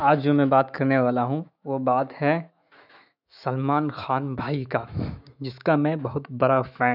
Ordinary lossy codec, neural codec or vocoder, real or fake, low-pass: none; autoencoder, 48 kHz, 128 numbers a frame, DAC-VAE, trained on Japanese speech; fake; 5.4 kHz